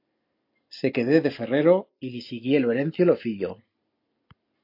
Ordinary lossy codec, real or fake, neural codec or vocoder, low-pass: AAC, 32 kbps; real; none; 5.4 kHz